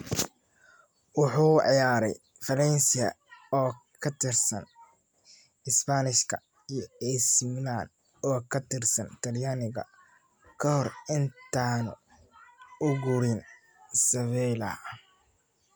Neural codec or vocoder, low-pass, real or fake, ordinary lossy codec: none; none; real; none